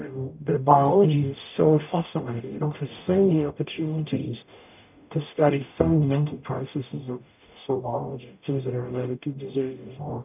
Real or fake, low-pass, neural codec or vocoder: fake; 3.6 kHz; codec, 44.1 kHz, 0.9 kbps, DAC